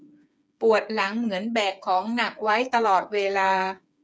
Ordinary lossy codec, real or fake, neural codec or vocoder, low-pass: none; fake; codec, 16 kHz, 8 kbps, FreqCodec, smaller model; none